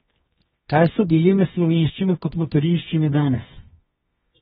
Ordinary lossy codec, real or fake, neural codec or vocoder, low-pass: AAC, 16 kbps; fake; codec, 24 kHz, 0.9 kbps, WavTokenizer, medium music audio release; 10.8 kHz